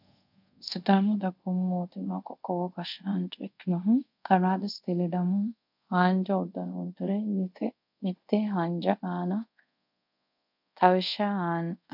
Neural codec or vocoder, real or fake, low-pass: codec, 24 kHz, 0.5 kbps, DualCodec; fake; 5.4 kHz